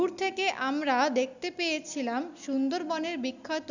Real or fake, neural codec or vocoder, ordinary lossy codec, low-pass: real; none; none; 7.2 kHz